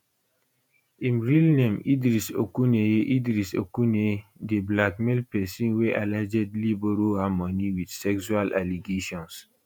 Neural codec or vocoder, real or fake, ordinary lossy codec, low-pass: vocoder, 48 kHz, 128 mel bands, Vocos; fake; none; none